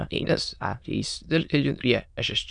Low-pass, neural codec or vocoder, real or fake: 9.9 kHz; autoencoder, 22.05 kHz, a latent of 192 numbers a frame, VITS, trained on many speakers; fake